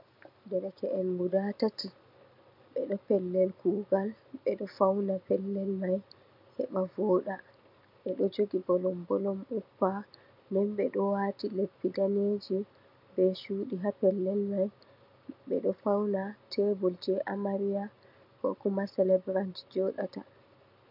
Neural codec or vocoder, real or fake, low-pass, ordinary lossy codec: none; real; 5.4 kHz; MP3, 48 kbps